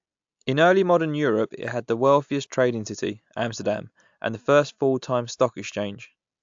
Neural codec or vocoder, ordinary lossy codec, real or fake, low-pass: none; AAC, 64 kbps; real; 7.2 kHz